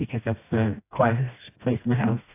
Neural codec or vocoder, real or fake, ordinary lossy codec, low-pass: codec, 16 kHz, 1 kbps, FreqCodec, smaller model; fake; AAC, 24 kbps; 3.6 kHz